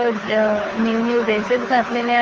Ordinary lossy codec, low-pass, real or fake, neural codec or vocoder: Opus, 24 kbps; 7.2 kHz; fake; codec, 24 kHz, 6 kbps, HILCodec